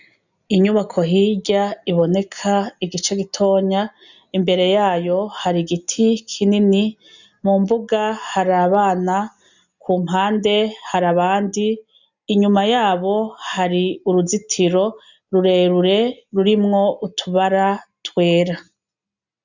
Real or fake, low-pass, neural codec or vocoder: real; 7.2 kHz; none